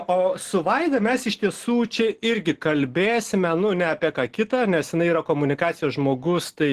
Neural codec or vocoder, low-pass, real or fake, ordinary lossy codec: none; 14.4 kHz; real; Opus, 16 kbps